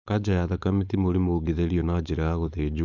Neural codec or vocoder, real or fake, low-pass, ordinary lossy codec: codec, 16 kHz, 4.8 kbps, FACodec; fake; 7.2 kHz; none